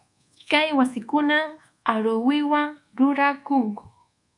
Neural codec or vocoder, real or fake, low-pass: codec, 24 kHz, 1.2 kbps, DualCodec; fake; 10.8 kHz